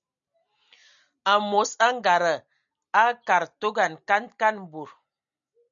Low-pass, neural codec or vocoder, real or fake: 7.2 kHz; none; real